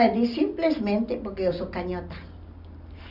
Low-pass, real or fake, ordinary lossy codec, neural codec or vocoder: 5.4 kHz; real; Opus, 64 kbps; none